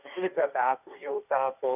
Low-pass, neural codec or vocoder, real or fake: 3.6 kHz; codec, 16 kHz, 1.1 kbps, Voila-Tokenizer; fake